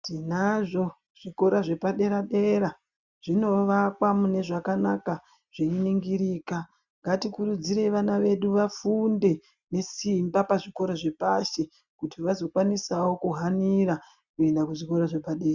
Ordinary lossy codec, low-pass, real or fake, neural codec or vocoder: Opus, 64 kbps; 7.2 kHz; real; none